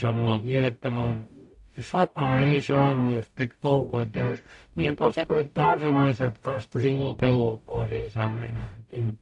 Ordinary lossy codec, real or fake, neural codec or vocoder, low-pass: AAC, 64 kbps; fake; codec, 44.1 kHz, 0.9 kbps, DAC; 10.8 kHz